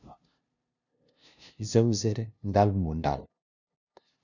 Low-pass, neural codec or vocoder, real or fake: 7.2 kHz; codec, 16 kHz, 0.5 kbps, FunCodec, trained on LibriTTS, 25 frames a second; fake